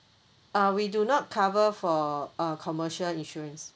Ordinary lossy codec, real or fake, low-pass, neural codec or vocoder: none; real; none; none